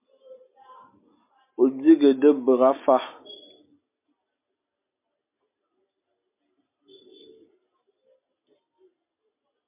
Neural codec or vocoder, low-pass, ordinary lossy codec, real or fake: none; 3.6 kHz; MP3, 32 kbps; real